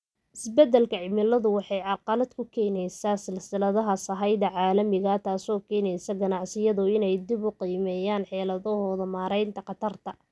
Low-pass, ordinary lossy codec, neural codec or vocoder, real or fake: none; none; none; real